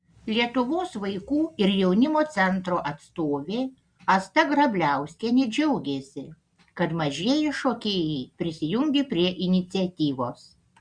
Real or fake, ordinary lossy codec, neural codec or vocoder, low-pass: real; Opus, 64 kbps; none; 9.9 kHz